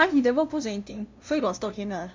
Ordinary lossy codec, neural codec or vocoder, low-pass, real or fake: MP3, 64 kbps; codec, 16 kHz, 0.5 kbps, FunCodec, trained on LibriTTS, 25 frames a second; 7.2 kHz; fake